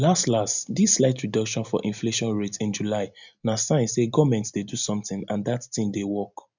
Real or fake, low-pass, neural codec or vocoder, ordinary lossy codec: real; 7.2 kHz; none; none